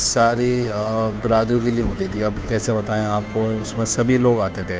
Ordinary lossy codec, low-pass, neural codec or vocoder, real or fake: none; none; codec, 16 kHz, 2 kbps, FunCodec, trained on Chinese and English, 25 frames a second; fake